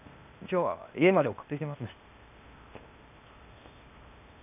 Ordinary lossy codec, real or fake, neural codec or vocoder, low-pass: none; fake; codec, 16 kHz, 0.8 kbps, ZipCodec; 3.6 kHz